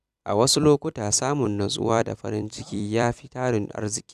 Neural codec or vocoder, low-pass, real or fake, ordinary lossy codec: vocoder, 44.1 kHz, 128 mel bands every 256 samples, BigVGAN v2; 14.4 kHz; fake; none